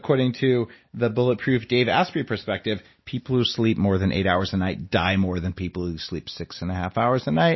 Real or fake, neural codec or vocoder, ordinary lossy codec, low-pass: real; none; MP3, 24 kbps; 7.2 kHz